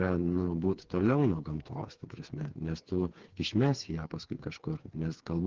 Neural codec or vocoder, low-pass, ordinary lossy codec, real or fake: codec, 16 kHz, 4 kbps, FreqCodec, smaller model; 7.2 kHz; Opus, 16 kbps; fake